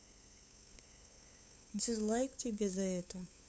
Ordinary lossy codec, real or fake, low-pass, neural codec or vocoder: none; fake; none; codec, 16 kHz, 8 kbps, FunCodec, trained on LibriTTS, 25 frames a second